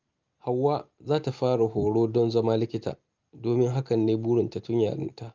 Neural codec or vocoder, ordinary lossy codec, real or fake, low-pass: none; Opus, 32 kbps; real; 7.2 kHz